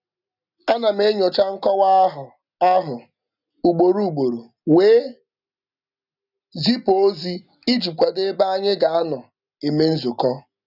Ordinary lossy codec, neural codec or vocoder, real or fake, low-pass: none; none; real; 5.4 kHz